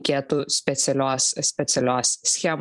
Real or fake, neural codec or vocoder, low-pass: fake; vocoder, 44.1 kHz, 128 mel bands every 256 samples, BigVGAN v2; 10.8 kHz